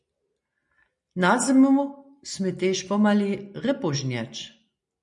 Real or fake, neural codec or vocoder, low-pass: real; none; 10.8 kHz